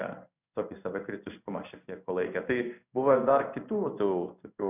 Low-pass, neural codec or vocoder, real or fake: 3.6 kHz; codec, 16 kHz in and 24 kHz out, 1 kbps, XY-Tokenizer; fake